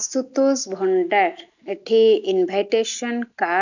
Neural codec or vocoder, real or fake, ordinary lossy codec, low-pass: none; real; none; 7.2 kHz